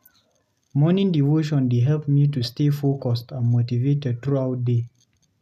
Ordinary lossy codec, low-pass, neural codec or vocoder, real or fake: none; 14.4 kHz; none; real